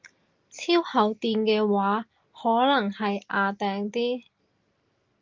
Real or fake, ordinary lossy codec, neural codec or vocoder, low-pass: real; Opus, 24 kbps; none; 7.2 kHz